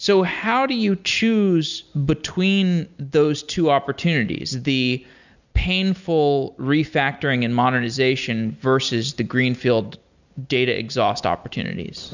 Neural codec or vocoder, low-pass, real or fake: none; 7.2 kHz; real